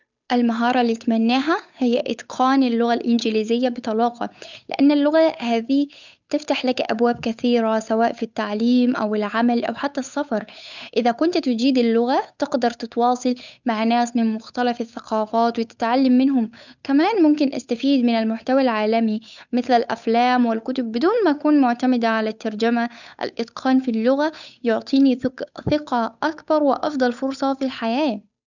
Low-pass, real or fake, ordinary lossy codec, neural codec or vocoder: 7.2 kHz; fake; none; codec, 16 kHz, 8 kbps, FunCodec, trained on Chinese and English, 25 frames a second